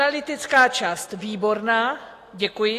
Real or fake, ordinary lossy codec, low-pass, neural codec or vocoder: real; AAC, 48 kbps; 14.4 kHz; none